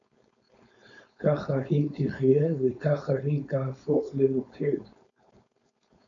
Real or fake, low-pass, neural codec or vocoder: fake; 7.2 kHz; codec, 16 kHz, 4.8 kbps, FACodec